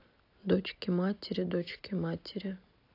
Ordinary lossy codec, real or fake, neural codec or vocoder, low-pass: AAC, 32 kbps; real; none; 5.4 kHz